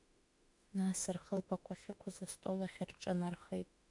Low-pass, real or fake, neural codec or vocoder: 10.8 kHz; fake; autoencoder, 48 kHz, 32 numbers a frame, DAC-VAE, trained on Japanese speech